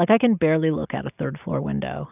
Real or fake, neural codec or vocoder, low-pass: real; none; 3.6 kHz